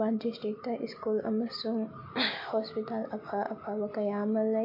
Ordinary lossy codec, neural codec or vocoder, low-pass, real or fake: MP3, 32 kbps; none; 5.4 kHz; real